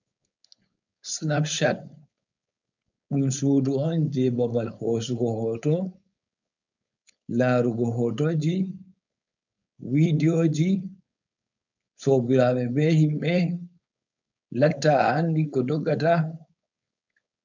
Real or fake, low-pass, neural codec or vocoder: fake; 7.2 kHz; codec, 16 kHz, 4.8 kbps, FACodec